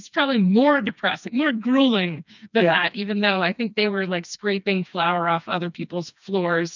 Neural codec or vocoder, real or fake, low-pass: codec, 16 kHz, 2 kbps, FreqCodec, smaller model; fake; 7.2 kHz